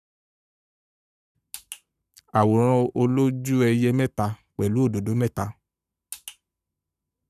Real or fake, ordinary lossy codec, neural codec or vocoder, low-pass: fake; none; codec, 44.1 kHz, 7.8 kbps, Pupu-Codec; 14.4 kHz